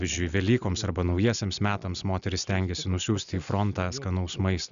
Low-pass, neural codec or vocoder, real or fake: 7.2 kHz; none; real